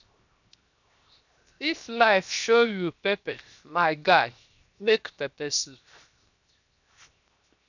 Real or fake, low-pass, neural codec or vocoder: fake; 7.2 kHz; codec, 16 kHz, 0.7 kbps, FocalCodec